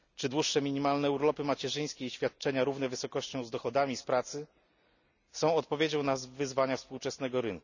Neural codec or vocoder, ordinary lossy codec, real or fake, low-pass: none; none; real; 7.2 kHz